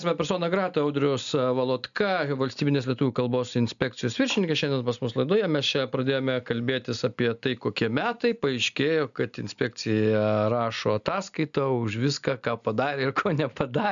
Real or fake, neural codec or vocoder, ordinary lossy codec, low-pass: real; none; MP3, 96 kbps; 7.2 kHz